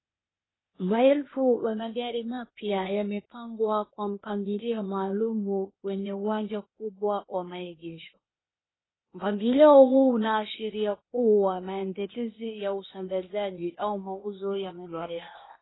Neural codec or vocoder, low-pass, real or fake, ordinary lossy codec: codec, 16 kHz, 0.8 kbps, ZipCodec; 7.2 kHz; fake; AAC, 16 kbps